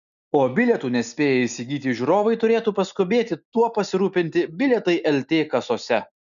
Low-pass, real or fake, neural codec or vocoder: 7.2 kHz; real; none